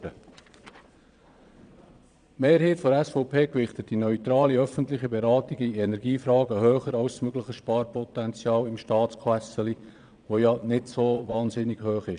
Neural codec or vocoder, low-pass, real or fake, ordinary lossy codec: vocoder, 22.05 kHz, 80 mel bands, Vocos; 9.9 kHz; fake; none